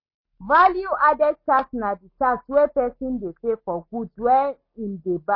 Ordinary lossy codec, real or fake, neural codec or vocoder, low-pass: MP3, 24 kbps; real; none; 5.4 kHz